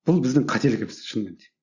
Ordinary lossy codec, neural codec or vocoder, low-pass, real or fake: Opus, 64 kbps; none; 7.2 kHz; real